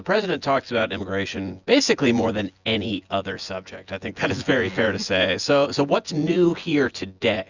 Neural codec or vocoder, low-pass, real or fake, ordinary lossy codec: vocoder, 24 kHz, 100 mel bands, Vocos; 7.2 kHz; fake; Opus, 64 kbps